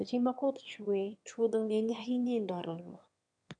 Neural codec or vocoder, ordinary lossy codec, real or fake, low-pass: autoencoder, 22.05 kHz, a latent of 192 numbers a frame, VITS, trained on one speaker; none; fake; 9.9 kHz